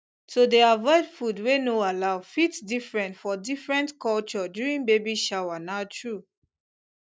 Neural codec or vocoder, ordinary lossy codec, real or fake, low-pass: none; none; real; none